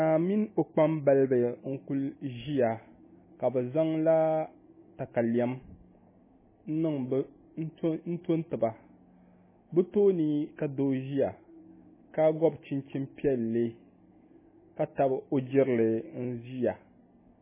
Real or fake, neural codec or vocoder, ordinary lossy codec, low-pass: real; none; MP3, 16 kbps; 3.6 kHz